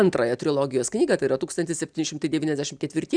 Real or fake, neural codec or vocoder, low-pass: real; none; 9.9 kHz